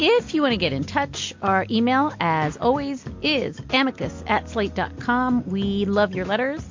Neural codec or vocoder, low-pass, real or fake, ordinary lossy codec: none; 7.2 kHz; real; MP3, 48 kbps